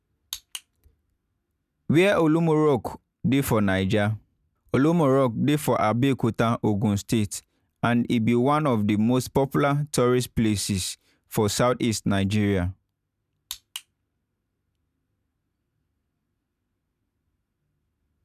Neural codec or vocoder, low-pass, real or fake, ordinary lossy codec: none; 14.4 kHz; real; none